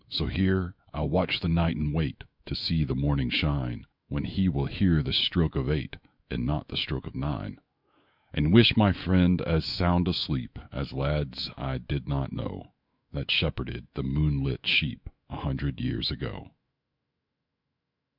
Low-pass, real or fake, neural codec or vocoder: 5.4 kHz; fake; autoencoder, 48 kHz, 128 numbers a frame, DAC-VAE, trained on Japanese speech